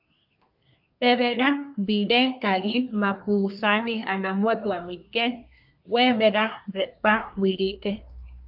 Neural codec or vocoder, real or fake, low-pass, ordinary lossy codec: codec, 24 kHz, 1 kbps, SNAC; fake; 5.4 kHz; AAC, 48 kbps